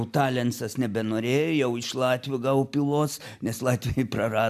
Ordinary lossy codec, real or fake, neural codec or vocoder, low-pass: MP3, 96 kbps; real; none; 14.4 kHz